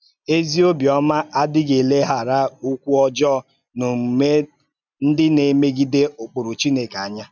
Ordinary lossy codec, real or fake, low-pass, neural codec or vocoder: none; real; 7.2 kHz; none